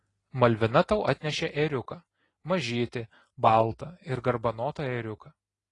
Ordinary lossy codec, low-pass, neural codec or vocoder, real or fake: AAC, 32 kbps; 10.8 kHz; none; real